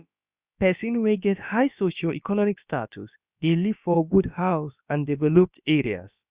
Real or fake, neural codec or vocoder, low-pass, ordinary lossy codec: fake; codec, 16 kHz, about 1 kbps, DyCAST, with the encoder's durations; 3.6 kHz; Opus, 64 kbps